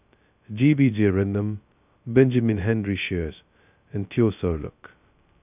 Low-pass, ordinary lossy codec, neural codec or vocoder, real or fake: 3.6 kHz; none; codec, 16 kHz, 0.2 kbps, FocalCodec; fake